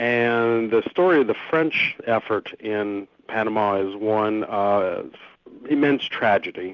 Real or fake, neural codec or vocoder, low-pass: real; none; 7.2 kHz